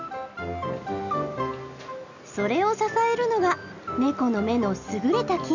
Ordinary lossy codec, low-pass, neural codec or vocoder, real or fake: none; 7.2 kHz; none; real